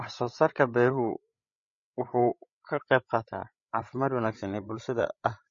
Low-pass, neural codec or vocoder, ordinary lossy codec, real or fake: 7.2 kHz; codec, 16 kHz, 16 kbps, FreqCodec, larger model; MP3, 32 kbps; fake